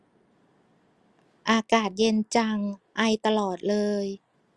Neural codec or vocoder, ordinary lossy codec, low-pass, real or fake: none; Opus, 32 kbps; 10.8 kHz; real